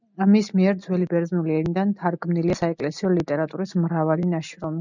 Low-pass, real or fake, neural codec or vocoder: 7.2 kHz; real; none